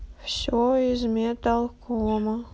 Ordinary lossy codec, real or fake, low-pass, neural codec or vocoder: none; real; none; none